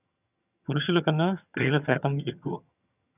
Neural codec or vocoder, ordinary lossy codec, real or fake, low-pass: vocoder, 22.05 kHz, 80 mel bands, HiFi-GAN; none; fake; 3.6 kHz